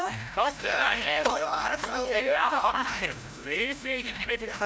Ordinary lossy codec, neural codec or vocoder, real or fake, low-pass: none; codec, 16 kHz, 0.5 kbps, FreqCodec, larger model; fake; none